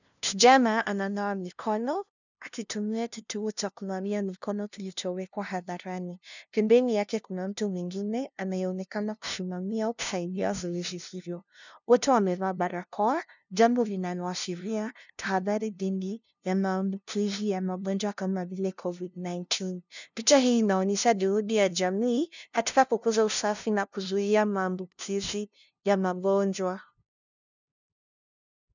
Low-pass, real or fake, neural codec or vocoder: 7.2 kHz; fake; codec, 16 kHz, 0.5 kbps, FunCodec, trained on LibriTTS, 25 frames a second